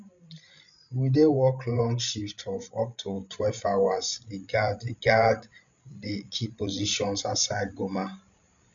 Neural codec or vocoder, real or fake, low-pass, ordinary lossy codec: codec, 16 kHz, 16 kbps, FreqCodec, larger model; fake; 7.2 kHz; none